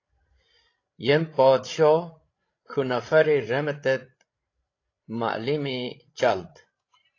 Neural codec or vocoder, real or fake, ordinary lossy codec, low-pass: codec, 16 kHz, 16 kbps, FreqCodec, larger model; fake; AAC, 32 kbps; 7.2 kHz